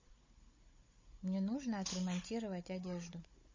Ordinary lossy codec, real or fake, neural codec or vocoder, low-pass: MP3, 32 kbps; fake; codec, 16 kHz, 16 kbps, FunCodec, trained on Chinese and English, 50 frames a second; 7.2 kHz